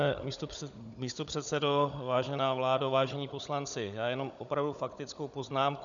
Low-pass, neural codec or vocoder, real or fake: 7.2 kHz; codec, 16 kHz, 16 kbps, FunCodec, trained on Chinese and English, 50 frames a second; fake